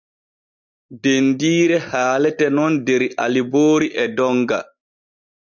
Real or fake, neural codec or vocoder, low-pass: real; none; 7.2 kHz